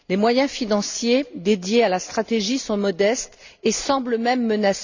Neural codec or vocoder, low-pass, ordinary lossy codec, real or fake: none; 7.2 kHz; Opus, 64 kbps; real